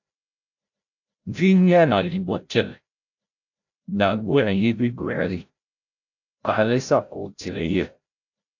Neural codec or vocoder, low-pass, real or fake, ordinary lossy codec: codec, 16 kHz, 0.5 kbps, FreqCodec, larger model; 7.2 kHz; fake; AAC, 48 kbps